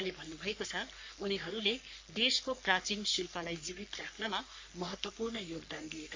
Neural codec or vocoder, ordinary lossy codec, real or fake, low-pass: codec, 44.1 kHz, 3.4 kbps, Pupu-Codec; MP3, 48 kbps; fake; 7.2 kHz